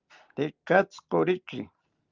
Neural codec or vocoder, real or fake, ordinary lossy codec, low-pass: none; real; Opus, 32 kbps; 7.2 kHz